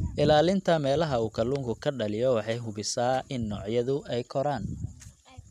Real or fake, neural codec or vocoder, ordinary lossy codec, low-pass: real; none; none; 14.4 kHz